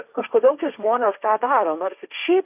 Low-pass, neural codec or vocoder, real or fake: 3.6 kHz; codec, 16 kHz, 1.1 kbps, Voila-Tokenizer; fake